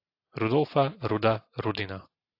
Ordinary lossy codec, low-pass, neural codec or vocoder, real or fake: AAC, 32 kbps; 5.4 kHz; none; real